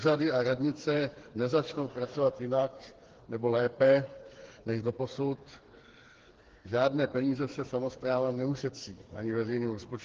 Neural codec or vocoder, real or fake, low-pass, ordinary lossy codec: codec, 16 kHz, 4 kbps, FreqCodec, smaller model; fake; 7.2 kHz; Opus, 16 kbps